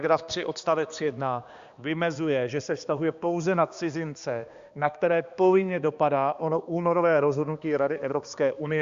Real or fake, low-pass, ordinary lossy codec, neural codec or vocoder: fake; 7.2 kHz; Opus, 64 kbps; codec, 16 kHz, 2 kbps, X-Codec, HuBERT features, trained on balanced general audio